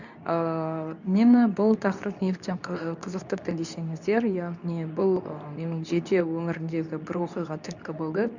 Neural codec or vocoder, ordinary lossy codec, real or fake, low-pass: codec, 24 kHz, 0.9 kbps, WavTokenizer, medium speech release version 2; none; fake; 7.2 kHz